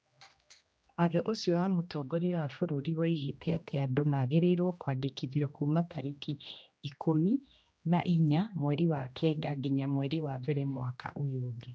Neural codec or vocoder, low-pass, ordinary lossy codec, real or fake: codec, 16 kHz, 1 kbps, X-Codec, HuBERT features, trained on general audio; none; none; fake